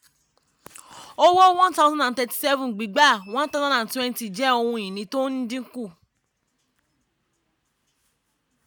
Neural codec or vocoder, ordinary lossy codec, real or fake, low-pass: none; none; real; none